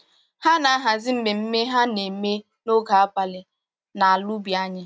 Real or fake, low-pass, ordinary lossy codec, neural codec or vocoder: real; none; none; none